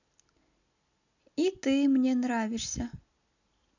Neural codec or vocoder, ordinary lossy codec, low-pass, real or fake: none; none; 7.2 kHz; real